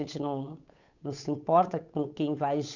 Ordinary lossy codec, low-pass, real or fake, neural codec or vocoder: none; 7.2 kHz; fake; codec, 16 kHz, 8 kbps, FunCodec, trained on Chinese and English, 25 frames a second